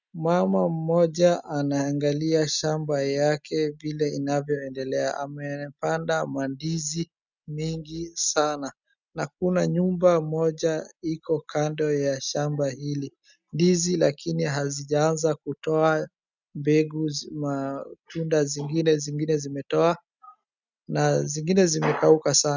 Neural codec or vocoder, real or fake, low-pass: none; real; 7.2 kHz